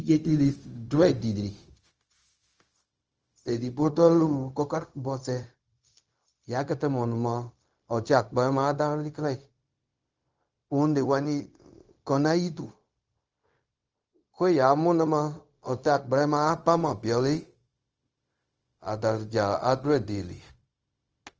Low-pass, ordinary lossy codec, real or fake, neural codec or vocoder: 7.2 kHz; Opus, 24 kbps; fake; codec, 16 kHz, 0.4 kbps, LongCat-Audio-Codec